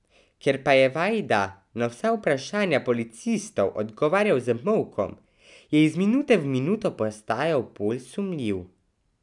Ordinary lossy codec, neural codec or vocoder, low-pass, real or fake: none; none; 10.8 kHz; real